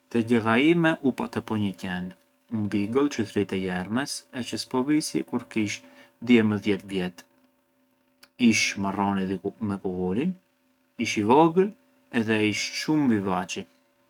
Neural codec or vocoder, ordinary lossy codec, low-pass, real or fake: codec, 44.1 kHz, 7.8 kbps, DAC; none; 19.8 kHz; fake